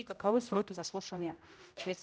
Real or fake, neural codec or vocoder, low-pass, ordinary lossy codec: fake; codec, 16 kHz, 0.5 kbps, X-Codec, HuBERT features, trained on general audio; none; none